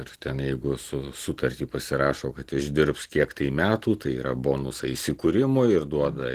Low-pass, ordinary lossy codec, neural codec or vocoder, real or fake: 14.4 kHz; Opus, 16 kbps; none; real